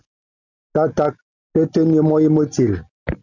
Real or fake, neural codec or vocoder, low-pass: real; none; 7.2 kHz